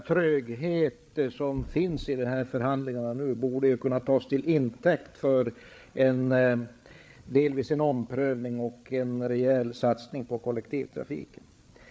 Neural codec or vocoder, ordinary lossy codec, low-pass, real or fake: codec, 16 kHz, 8 kbps, FreqCodec, larger model; none; none; fake